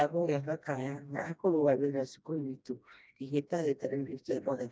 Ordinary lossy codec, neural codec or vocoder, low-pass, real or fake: none; codec, 16 kHz, 1 kbps, FreqCodec, smaller model; none; fake